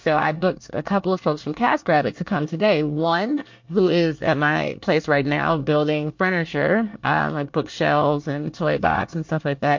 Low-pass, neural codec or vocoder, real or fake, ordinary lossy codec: 7.2 kHz; codec, 24 kHz, 1 kbps, SNAC; fake; MP3, 48 kbps